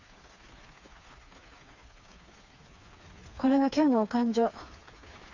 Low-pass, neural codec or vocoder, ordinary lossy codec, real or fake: 7.2 kHz; codec, 16 kHz, 4 kbps, FreqCodec, smaller model; none; fake